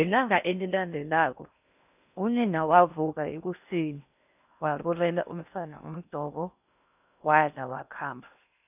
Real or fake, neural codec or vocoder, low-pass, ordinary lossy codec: fake; codec, 16 kHz in and 24 kHz out, 0.6 kbps, FocalCodec, streaming, 4096 codes; 3.6 kHz; none